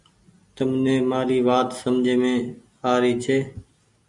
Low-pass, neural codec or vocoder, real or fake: 10.8 kHz; none; real